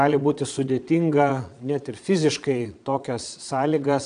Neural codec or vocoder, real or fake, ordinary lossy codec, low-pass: vocoder, 22.05 kHz, 80 mel bands, Vocos; fake; AAC, 96 kbps; 9.9 kHz